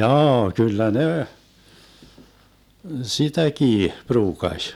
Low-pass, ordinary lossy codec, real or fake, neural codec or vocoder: 19.8 kHz; none; real; none